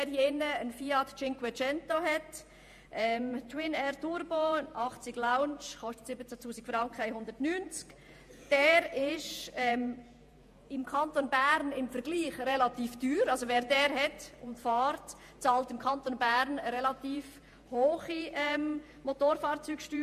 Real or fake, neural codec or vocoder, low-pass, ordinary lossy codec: fake; vocoder, 48 kHz, 128 mel bands, Vocos; 14.4 kHz; MP3, 64 kbps